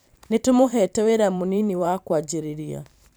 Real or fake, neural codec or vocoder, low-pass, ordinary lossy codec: fake; vocoder, 44.1 kHz, 128 mel bands every 512 samples, BigVGAN v2; none; none